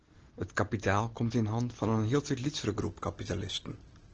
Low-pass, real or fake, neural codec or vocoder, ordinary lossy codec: 7.2 kHz; real; none; Opus, 16 kbps